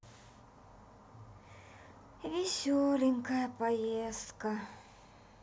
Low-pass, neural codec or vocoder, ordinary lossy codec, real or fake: none; none; none; real